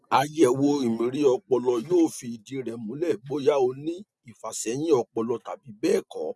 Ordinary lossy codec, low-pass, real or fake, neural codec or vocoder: none; none; real; none